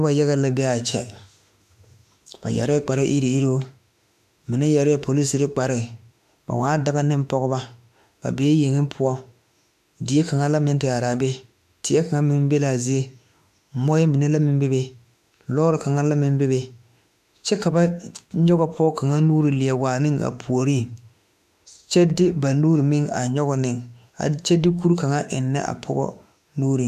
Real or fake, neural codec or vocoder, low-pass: fake; autoencoder, 48 kHz, 32 numbers a frame, DAC-VAE, trained on Japanese speech; 14.4 kHz